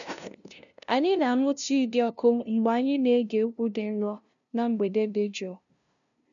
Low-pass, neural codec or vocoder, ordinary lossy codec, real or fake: 7.2 kHz; codec, 16 kHz, 0.5 kbps, FunCodec, trained on LibriTTS, 25 frames a second; none; fake